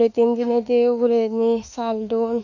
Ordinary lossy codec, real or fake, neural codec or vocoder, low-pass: none; fake; autoencoder, 48 kHz, 32 numbers a frame, DAC-VAE, trained on Japanese speech; 7.2 kHz